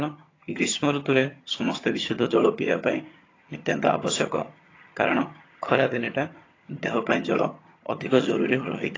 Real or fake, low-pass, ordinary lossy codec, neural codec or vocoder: fake; 7.2 kHz; AAC, 32 kbps; vocoder, 22.05 kHz, 80 mel bands, HiFi-GAN